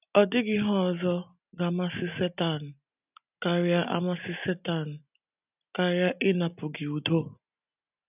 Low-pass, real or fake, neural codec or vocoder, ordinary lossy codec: 3.6 kHz; real; none; none